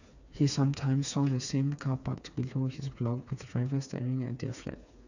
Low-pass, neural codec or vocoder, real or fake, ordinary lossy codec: 7.2 kHz; codec, 16 kHz, 4 kbps, FreqCodec, smaller model; fake; none